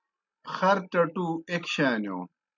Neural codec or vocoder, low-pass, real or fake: none; 7.2 kHz; real